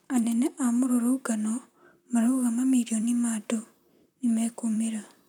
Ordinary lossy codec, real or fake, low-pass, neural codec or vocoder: none; fake; 19.8 kHz; vocoder, 44.1 kHz, 128 mel bands, Pupu-Vocoder